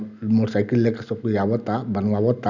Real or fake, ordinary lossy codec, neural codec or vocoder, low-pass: real; none; none; 7.2 kHz